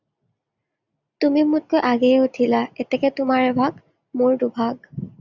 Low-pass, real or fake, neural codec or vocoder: 7.2 kHz; real; none